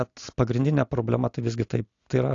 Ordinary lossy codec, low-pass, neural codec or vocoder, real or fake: AAC, 48 kbps; 7.2 kHz; none; real